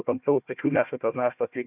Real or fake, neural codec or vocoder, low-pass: fake; codec, 16 kHz, 1 kbps, FunCodec, trained on Chinese and English, 50 frames a second; 3.6 kHz